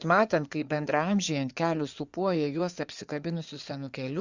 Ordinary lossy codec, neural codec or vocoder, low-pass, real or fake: Opus, 64 kbps; codec, 16 kHz in and 24 kHz out, 2.2 kbps, FireRedTTS-2 codec; 7.2 kHz; fake